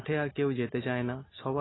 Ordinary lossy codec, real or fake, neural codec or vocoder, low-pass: AAC, 16 kbps; real; none; 7.2 kHz